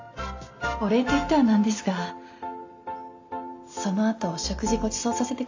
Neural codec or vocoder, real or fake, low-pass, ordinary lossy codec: none; real; 7.2 kHz; AAC, 48 kbps